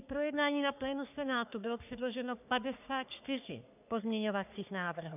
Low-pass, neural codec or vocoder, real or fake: 3.6 kHz; codec, 44.1 kHz, 3.4 kbps, Pupu-Codec; fake